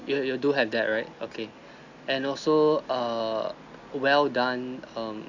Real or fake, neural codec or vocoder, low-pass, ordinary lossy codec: real; none; 7.2 kHz; none